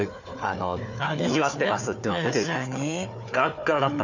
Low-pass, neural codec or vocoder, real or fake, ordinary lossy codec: 7.2 kHz; codec, 16 kHz, 4 kbps, FunCodec, trained on Chinese and English, 50 frames a second; fake; none